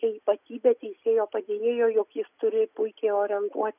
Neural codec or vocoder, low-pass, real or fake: none; 3.6 kHz; real